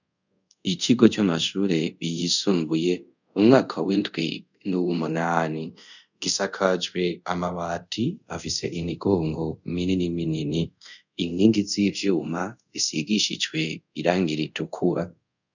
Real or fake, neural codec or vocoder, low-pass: fake; codec, 24 kHz, 0.5 kbps, DualCodec; 7.2 kHz